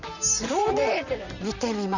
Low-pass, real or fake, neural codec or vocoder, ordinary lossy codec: 7.2 kHz; fake; vocoder, 44.1 kHz, 128 mel bands, Pupu-Vocoder; none